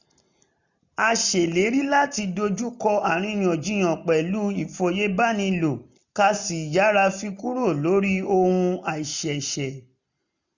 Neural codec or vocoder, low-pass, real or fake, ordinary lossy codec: none; 7.2 kHz; real; none